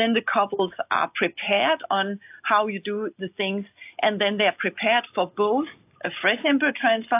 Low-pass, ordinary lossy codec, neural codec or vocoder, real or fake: 3.6 kHz; AAC, 32 kbps; none; real